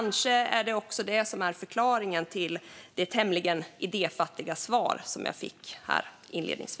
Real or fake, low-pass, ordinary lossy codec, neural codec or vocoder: real; none; none; none